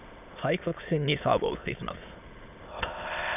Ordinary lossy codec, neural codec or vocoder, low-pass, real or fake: none; autoencoder, 22.05 kHz, a latent of 192 numbers a frame, VITS, trained on many speakers; 3.6 kHz; fake